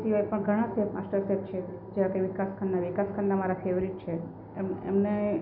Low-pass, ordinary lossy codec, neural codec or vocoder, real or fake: 5.4 kHz; none; none; real